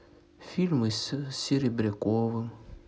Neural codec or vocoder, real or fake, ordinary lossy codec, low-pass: none; real; none; none